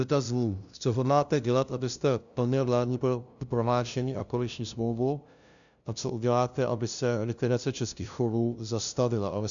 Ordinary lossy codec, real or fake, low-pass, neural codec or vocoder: MP3, 96 kbps; fake; 7.2 kHz; codec, 16 kHz, 0.5 kbps, FunCodec, trained on LibriTTS, 25 frames a second